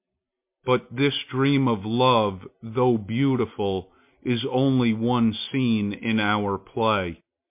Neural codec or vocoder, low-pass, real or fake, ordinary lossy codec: none; 3.6 kHz; real; MP3, 32 kbps